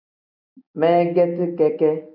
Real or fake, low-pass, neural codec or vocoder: real; 5.4 kHz; none